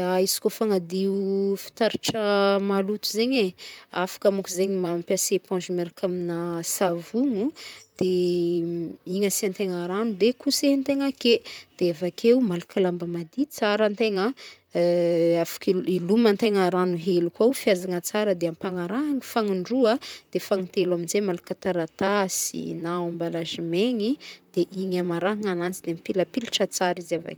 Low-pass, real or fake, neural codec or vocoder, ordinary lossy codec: none; fake; vocoder, 44.1 kHz, 128 mel bands, Pupu-Vocoder; none